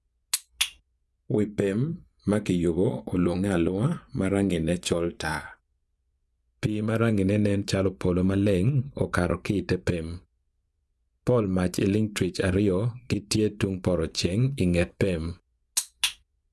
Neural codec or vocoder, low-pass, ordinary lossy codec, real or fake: vocoder, 24 kHz, 100 mel bands, Vocos; none; none; fake